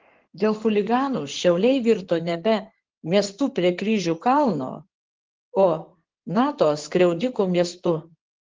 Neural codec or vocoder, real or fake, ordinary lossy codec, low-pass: codec, 16 kHz in and 24 kHz out, 2.2 kbps, FireRedTTS-2 codec; fake; Opus, 16 kbps; 7.2 kHz